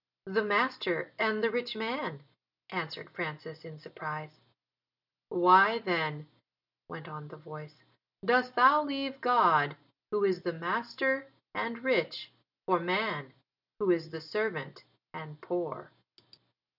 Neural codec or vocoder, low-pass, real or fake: none; 5.4 kHz; real